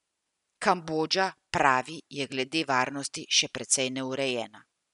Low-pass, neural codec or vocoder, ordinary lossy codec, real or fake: 10.8 kHz; none; none; real